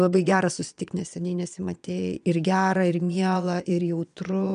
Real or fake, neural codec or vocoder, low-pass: fake; vocoder, 22.05 kHz, 80 mel bands, Vocos; 9.9 kHz